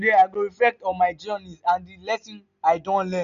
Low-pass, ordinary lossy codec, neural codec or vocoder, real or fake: 7.2 kHz; none; none; real